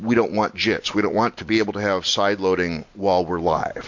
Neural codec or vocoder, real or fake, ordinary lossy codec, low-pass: none; real; MP3, 48 kbps; 7.2 kHz